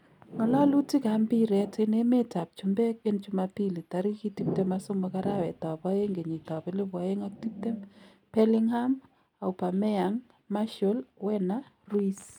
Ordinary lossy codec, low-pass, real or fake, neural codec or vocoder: none; 19.8 kHz; real; none